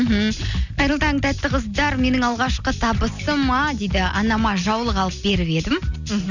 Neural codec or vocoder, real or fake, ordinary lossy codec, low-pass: none; real; none; 7.2 kHz